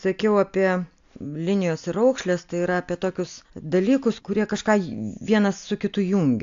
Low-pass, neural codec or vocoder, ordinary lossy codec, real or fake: 7.2 kHz; none; AAC, 64 kbps; real